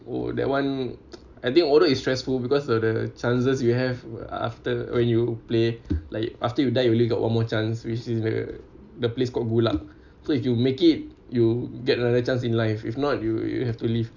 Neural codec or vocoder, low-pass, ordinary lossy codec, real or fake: none; 7.2 kHz; none; real